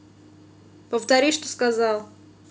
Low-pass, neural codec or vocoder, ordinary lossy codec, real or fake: none; none; none; real